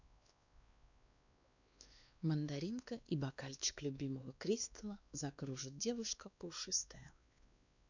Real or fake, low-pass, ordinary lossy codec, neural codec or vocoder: fake; 7.2 kHz; none; codec, 16 kHz, 2 kbps, X-Codec, WavLM features, trained on Multilingual LibriSpeech